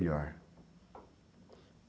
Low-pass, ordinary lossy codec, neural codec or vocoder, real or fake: none; none; none; real